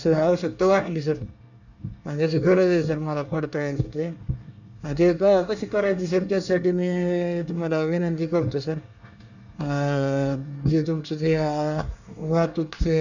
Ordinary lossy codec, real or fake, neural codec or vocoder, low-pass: none; fake; codec, 24 kHz, 1 kbps, SNAC; 7.2 kHz